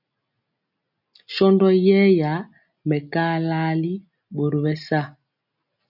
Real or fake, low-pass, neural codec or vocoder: real; 5.4 kHz; none